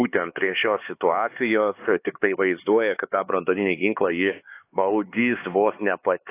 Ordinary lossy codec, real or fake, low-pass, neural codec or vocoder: AAC, 24 kbps; fake; 3.6 kHz; codec, 16 kHz, 4 kbps, X-Codec, HuBERT features, trained on LibriSpeech